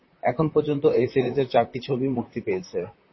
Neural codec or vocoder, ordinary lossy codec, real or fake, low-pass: vocoder, 44.1 kHz, 128 mel bands, Pupu-Vocoder; MP3, 24 kbps; fake; 7.2 kHz